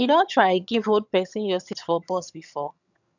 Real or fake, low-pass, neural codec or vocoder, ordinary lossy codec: fake; 7.2 kHz; vocoder, 22.05 kHz, 80 mel bands, HiFi-GAN; none